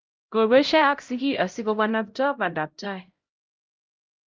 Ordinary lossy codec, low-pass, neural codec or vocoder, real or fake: Opus, 24 kbps; 7.2 kHz; codec, 16 kHz, 0.5 kbps, X-Codec, HuBERT features, trained on LibriSpeech; fake